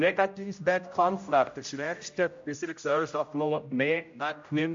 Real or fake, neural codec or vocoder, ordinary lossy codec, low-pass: fake; codec, 16 kHz, 0.5 kbps, X-Codec, HuBERT features, trained on general audio; MP3, 48 kbps; 7.2 kHz